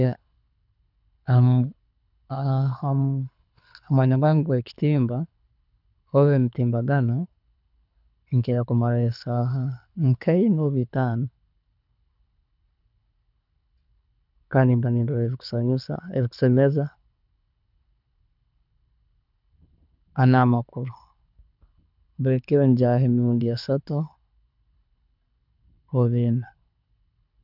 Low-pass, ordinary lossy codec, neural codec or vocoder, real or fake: 5.4 kHz; none; codec, 24 kHz, 6 kbps, HILCodec; fake